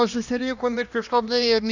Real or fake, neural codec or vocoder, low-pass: fake; codec, 16 kHz, 1 kbps, X-Codec, HuBERT features, trained on LibriSpeech; 7.2 kHz